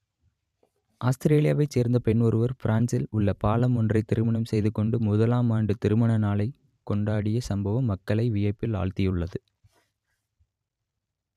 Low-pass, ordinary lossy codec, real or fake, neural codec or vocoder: 14.4 kHz; none; fake; vocoder, 44.1 kHz, 128 mel bands every 512 samples, BigVGAN v2